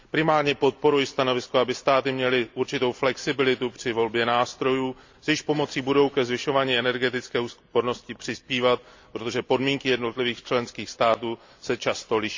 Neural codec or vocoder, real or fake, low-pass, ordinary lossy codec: none; real; 7.2 kHz; MP3, 64 kbps